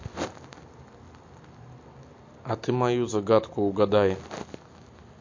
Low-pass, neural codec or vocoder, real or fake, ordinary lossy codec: 7.2 kHz; none; real; MP3, 48 kbps